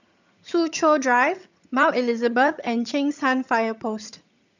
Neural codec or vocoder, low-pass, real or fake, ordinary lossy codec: vocoder, 22.05 kHz, 80 mel bands, HiFi-GAN; 7.2 kHz; fake; none